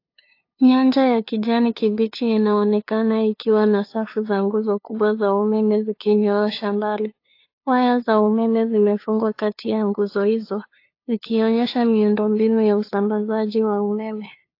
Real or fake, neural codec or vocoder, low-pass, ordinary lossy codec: fake; codec, 16 kHz, 2 kbps, FunCodec, trained on LibriTTS, 25 frames a second; 5.4 kHz; AAC, 32 kbps